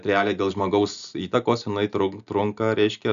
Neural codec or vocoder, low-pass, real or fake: none; 7.2 kHz; real